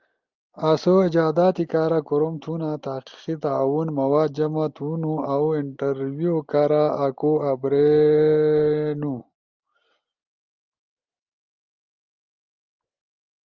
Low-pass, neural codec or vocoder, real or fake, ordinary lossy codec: 7.2 kHz; none; real; Opus, 24 kbps